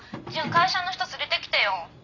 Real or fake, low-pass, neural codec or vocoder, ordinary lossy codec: fake; 7.2 kHz; vocoder, 44.1 kHz, 128 mel bands every 256 samples, BigVGAN v2; none